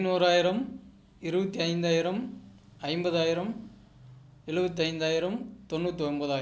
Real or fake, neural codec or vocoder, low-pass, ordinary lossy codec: real; none; none; none